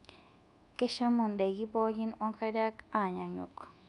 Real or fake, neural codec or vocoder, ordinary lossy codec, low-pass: fake; codec, 24 kHz, 1.2 kbps, DualCodec; none; 10.8 kHz